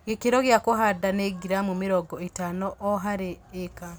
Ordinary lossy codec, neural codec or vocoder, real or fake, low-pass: none; none; real; none